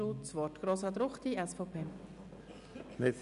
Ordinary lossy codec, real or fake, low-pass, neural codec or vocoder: none; real; 10.8 kHz; none